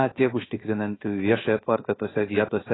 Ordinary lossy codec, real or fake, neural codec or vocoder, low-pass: AAC, 16 kbps; fake; codec, 16 kHz, 0.7 kbps, FocalCodec; 7.2 kHz